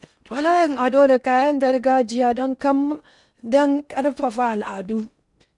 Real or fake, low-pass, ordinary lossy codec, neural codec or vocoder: fake; 10.8 kHz; none; codec, 16 kHz in and 24 kHz out, 0.6 kbps, FocalCodec, streaming, 4096 codes